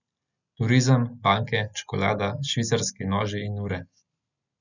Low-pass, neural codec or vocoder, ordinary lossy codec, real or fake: 7.2 kHz; none; none; real